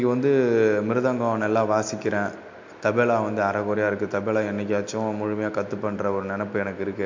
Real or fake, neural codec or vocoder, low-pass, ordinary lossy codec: real; none; 7.2 kHz; MP3, 48 kbps